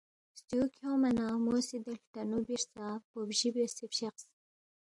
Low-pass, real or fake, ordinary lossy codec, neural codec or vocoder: 10.8 kHz; real; AAC, 64 kbps; none